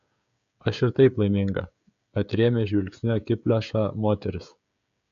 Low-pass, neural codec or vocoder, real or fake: 7.2 kHz; codec, 16 kHz, 16 kbps, FreqCodec, smaller model; fake